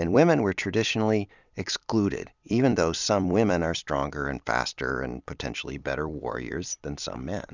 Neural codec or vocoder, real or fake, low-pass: vocoder, 44.1 kHz, 128 mel bands every 256 samples, BigVGAN v2; fake; 7.2 kHz